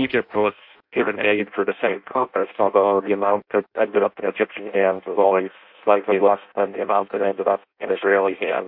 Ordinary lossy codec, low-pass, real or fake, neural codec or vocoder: MP3, 48 kbps; 5.4 kHz; fake; codec, 16 kHz in and 24 kHz out, 0.6 kbps, FireRedTTS-2 codec